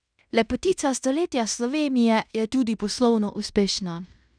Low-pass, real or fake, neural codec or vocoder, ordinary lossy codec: 9.9 kHz; fake; codec, 16 kHz in and 24 kHz out, 0.9 kbps, LongCat-Audio-Codec, fine tuned four codebook decoder; none